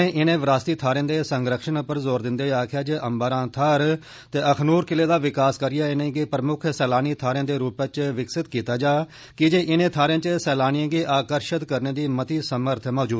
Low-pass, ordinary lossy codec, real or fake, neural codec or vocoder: none; none; real; none